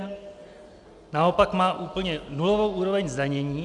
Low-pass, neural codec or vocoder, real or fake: 10.8 kHz; none; real